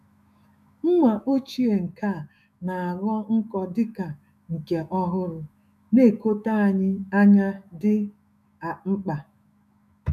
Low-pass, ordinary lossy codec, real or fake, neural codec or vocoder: 14.4 kHz; none; fake; autoencoder, 48 kHz, 128 numbers a frame, DAC-VAE, trained on Japanese speech